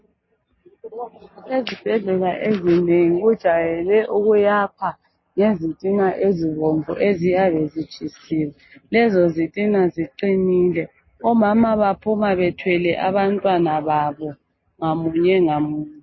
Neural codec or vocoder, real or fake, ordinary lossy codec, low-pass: none; real; MP3, 24 kbps; 7.2 kHz